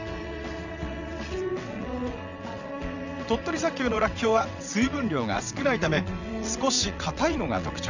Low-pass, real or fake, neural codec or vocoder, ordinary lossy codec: 7.2 kHz; fake; vocoder, 22.05 kHz, 80 mel bands, WaveNeXt; none